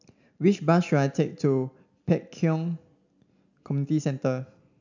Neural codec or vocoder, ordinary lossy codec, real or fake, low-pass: none; none; real; 7.2 kHz